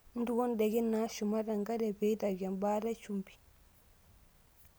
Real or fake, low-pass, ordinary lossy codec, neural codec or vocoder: real; none; none; none